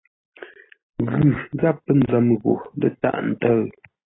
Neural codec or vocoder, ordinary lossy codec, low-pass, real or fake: none; AAC, 16 kbps; 7.2 kHz; real